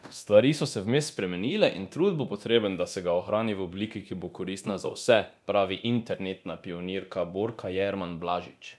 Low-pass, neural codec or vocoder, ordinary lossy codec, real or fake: none; codec, 24 kHz, 0.9 kbps, DualCodec; none; fake